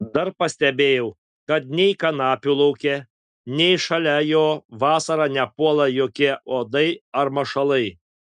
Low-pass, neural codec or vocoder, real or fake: 9.9 kHz; none; real